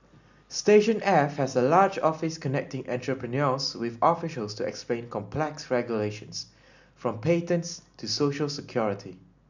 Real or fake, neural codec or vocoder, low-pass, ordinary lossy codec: real; none; 7.2 kHz; none